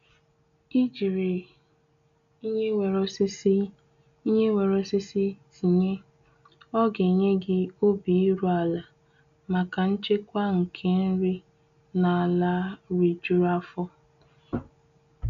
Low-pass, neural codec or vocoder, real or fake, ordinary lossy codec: 7.2 kHz; none; real; none